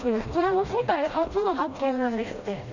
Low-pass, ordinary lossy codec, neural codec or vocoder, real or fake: 7.2 kHz; none; codec, 16 kHz, 1 kbps, FreqCodec, smaller model; fake